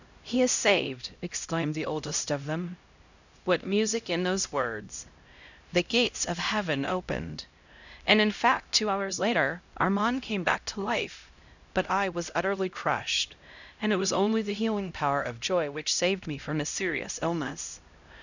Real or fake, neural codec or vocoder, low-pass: fake; codec, 16 kHz, 0.5 kbps, X-Codec, HuBERT features, trained on LibriSpeech; 7.2 kHz